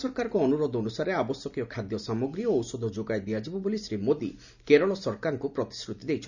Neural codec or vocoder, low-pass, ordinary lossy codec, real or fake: none; 7.2 kHz; none; real